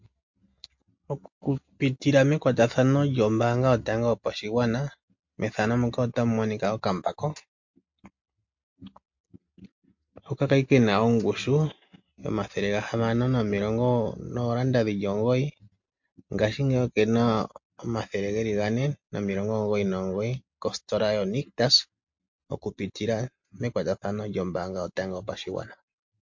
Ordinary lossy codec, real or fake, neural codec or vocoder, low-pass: MP3, 48 kbps; real; none; 7.2 kHz